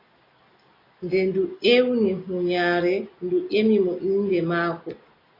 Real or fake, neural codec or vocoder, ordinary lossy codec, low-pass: real; none; AAC, 24 kbps; 5.4 kHz